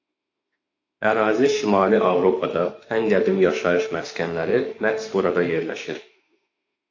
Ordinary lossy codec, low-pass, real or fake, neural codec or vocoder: AAC, 48 kbps; 7.2 kHz; fake; autoencoder, 48 kHz, 32 numbers a frame, DAC-VAE, trained on Japanese speech